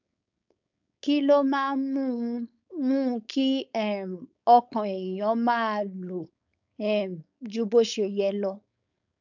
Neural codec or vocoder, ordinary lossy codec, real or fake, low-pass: codec, 16 kHz, 4.8 kbps, FACodec; none; fake; 7.2 kHz